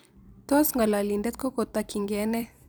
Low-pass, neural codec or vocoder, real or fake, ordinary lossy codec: none; vocoder, 44.1 kHz, 128 mel bands every 256 samples, BigVGAN v2; fake; none